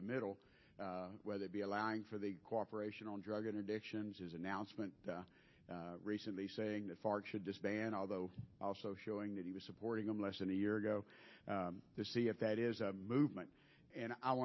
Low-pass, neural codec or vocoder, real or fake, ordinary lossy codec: 7.2 kHz; none; real; MP3, 24 kbps